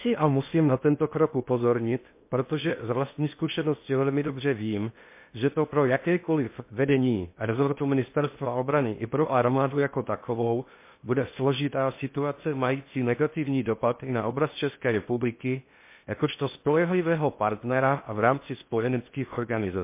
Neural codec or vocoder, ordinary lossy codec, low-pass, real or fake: codec, 16 kHz in and 24 kHz out, 0.6 kbps, FocalCodec, streaming, 2048 codes; MP3, 24 kbps; 3.6 kHz; fake